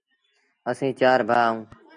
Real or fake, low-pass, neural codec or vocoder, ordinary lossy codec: real; 9.9 kHz; none; MP3, 96 kbps